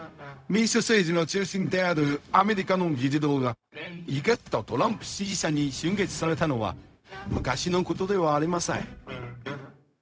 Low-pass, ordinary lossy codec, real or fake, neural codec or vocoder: none; none; fake; codec, 16 kHz, 0.4 kbps, LongCat-Audio-Codec